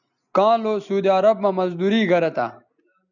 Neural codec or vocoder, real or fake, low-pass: none; real; 7.2 kHz